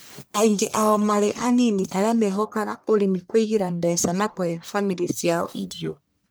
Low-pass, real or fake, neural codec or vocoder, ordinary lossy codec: none; fake; codec, 44.1 kHz, 1.7 kbps, Pupu-Codec; none